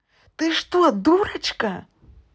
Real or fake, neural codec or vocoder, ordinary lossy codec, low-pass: real; none; none; none